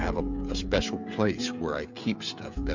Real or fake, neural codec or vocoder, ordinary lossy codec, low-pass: fake; codec, 16 kHz, 16 kbps, FreqCodec, smaller model; MP3, 64 kbps; 7.2 kHz